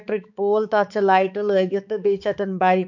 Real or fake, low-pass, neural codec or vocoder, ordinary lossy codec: fake; 7.2 kHz; codec, 16 kHz, 4 kbps, X-Codec, HuBERT features, trained on balanced general audio; none